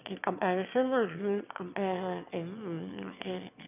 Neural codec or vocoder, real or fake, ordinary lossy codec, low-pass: autoencoder, 22.05 kHz, a latent of 192 numbers a frame, VITS, trained on one speaker; fake; none; 3.6 kHz